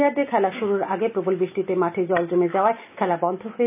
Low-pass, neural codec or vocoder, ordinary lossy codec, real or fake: 3.6 kHz; none; none; real